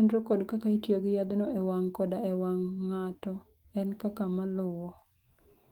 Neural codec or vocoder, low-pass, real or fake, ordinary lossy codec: autoencoder, 48 kHz, 128 numbers a frame, DAC-VAE, trained on Japanese speech; 19.8 kHz; fake; Opus, 24 kbps